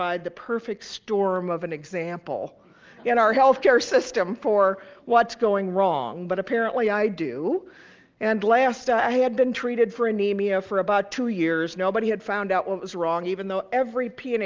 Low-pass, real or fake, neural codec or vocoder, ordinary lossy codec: 7.2 kHz; real; none; Opus, 32 kbps